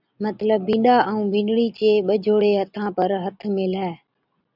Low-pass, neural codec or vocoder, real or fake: 5.4 kHz; none; real